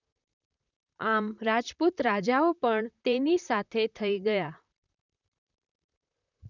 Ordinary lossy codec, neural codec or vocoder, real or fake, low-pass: none; vocoder, 44.1 kHz, 128 mel bands, Pupu-Vocoder; fake; 7.2 kHz